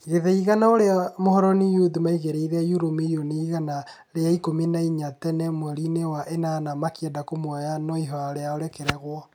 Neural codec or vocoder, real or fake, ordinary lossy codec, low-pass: none; real; none; 19.8 kHz